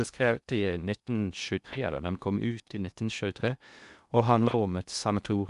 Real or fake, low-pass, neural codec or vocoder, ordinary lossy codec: fake; 10.8 kHz; codec, 16 kHz in and 24 kHz out, 0.6 kbps, FocalCodec, streaming, 2048 codes; none